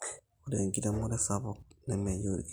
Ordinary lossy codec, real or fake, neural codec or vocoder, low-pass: none; real; none; none